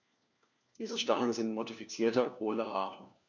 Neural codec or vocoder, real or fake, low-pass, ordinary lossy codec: codec, 16 kHz, 1 kbps, FunCodec, trained on LibriTTS, 50 frames a second; fake; 7.2 kHz; none